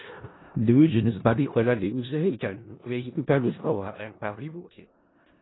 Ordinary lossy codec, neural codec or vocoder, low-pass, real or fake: AAC, 16 kbps; codec, 16 kHz in and 24 kHz out, 0.4 kbps, LongCat-Audio-Codec, four codebook decoder; 7.2 kHz; fake